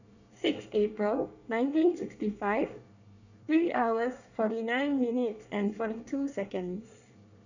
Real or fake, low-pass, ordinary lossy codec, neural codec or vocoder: fake; 7.2 kHz; none; codec, 24 kHz, 1 kbps, SNAC